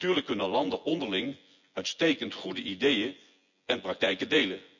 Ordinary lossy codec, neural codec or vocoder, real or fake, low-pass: none; vocoder, 24 kHz, 100 mel bands, Vocos; fake; 7.2 kHz